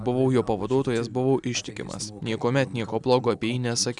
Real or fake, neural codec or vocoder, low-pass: real; none; 10.8 kHz